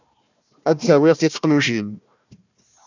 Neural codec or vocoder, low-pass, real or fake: codec, 16 kHz, 1 kbps, FunCodec, trained on Chinese and English, 50 frames a second; 7.2 kHz; fake